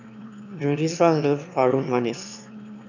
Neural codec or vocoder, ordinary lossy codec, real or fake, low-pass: autoencoder, 22.05 kHz, a latent of 192 numbers a frame, VITS, trained on one speaker; none; fake; 7.2 kHz